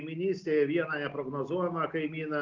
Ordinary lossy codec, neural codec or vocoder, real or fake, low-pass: Opus, 32 kbps; none; real; 7.2 kHz